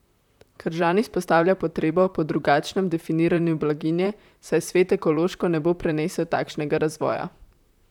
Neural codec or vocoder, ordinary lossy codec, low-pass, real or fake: vocoder, 44.1 kHz, 128 mel bands, Pupu-Vocoder; none; 19.8 kHz; fake